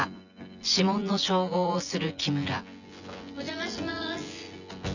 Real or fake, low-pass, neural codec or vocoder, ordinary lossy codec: fake; 7.2 kHz; vocoder, 24 kHz, 100 mel bands, Vocos; none